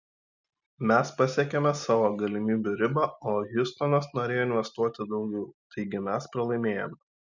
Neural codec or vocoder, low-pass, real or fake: none; 7.2 kHz; real